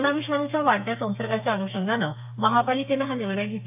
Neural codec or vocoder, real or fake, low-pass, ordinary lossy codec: codec, 32 kHz, 1.9 kbps, SNAC; fake; 3.6 kHz; AAC, 32 kbps